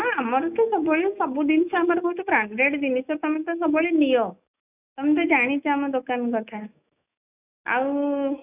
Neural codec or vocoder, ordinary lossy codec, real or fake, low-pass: autoencoder, 48 kHz, 128 numbers a frame, DAC-VAE, trained on Japanese speech; none; fake; 3.6 kHz